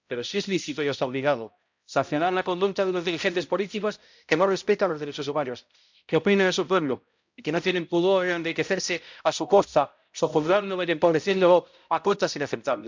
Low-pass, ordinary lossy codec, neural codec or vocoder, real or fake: 7.2 kHz; MP3, 64 kbps; codec, 16 kHz, 0.5 kbps, X-Codec, HuBERT features, trained on balanced general audio; fake